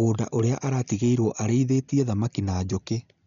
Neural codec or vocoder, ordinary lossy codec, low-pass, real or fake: none; none; 7.2 kHz; real